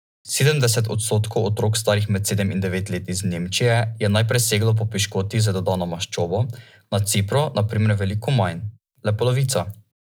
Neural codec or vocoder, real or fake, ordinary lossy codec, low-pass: none; real; none; none